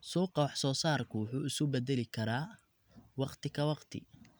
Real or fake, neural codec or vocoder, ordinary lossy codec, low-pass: real; none; none; none